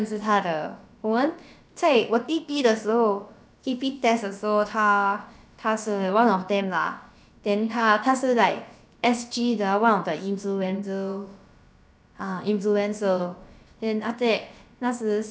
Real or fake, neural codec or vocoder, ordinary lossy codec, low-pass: fake; codec, 16 kHz, about 1 kbps, DyCAST, with the encoder's durations; none; none